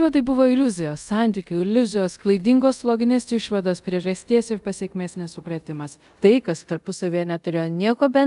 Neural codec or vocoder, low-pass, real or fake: codec, 24 kHz, 0.5 kbps, DualCodec; 10.8 kHz; fake